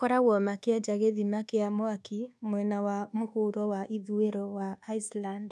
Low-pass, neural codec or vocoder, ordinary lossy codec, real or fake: none; codec, 24 kHz, 1.2 kbps, DualCodec; none; fake